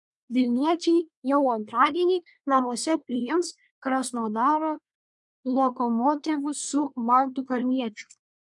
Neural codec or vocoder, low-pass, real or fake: codec, 24 kHz, 1 kbps, SNAC; 10.8 kHz; fake